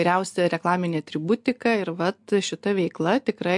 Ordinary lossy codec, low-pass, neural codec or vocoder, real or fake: MP3, 64 kbps; 10.8 kHz; none; real